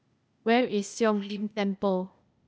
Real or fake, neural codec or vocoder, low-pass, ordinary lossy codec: fake; codec, 16 kHz, 0.8 kbps, ZipCodec; none; none